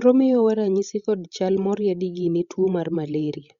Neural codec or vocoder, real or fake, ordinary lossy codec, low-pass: codec, 16 kHz, 16 kbps, FreqCodec, larger model; fake; Opus, 64 kbps; 7.2 kHz